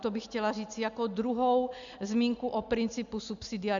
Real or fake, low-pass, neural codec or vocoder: real; 7.2 kHz; none